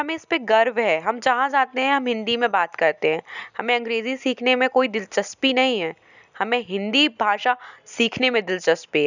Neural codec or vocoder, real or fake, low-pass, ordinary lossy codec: none; real; 7.2 kHz; none